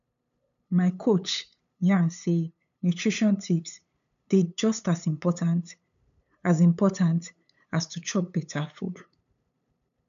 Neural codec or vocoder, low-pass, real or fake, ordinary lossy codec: codec, 16 kHz, 8 kbps, FunCodec, trained on LibriTTS, 25 frames a second; 7.2 kHz; fake; none